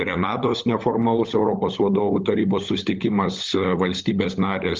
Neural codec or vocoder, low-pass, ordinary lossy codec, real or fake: codec, 16 kHz, 16 kbps, FunCodec, trained on LibriTTS, 50 frames a second; 7.2 kHz; Opus, 32 kbps; fake